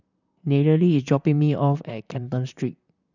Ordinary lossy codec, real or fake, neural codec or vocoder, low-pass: none; fake; vocoder, 44.1 kHz, 128 mel bands, Pupu-Vocoder; 7.2 kHz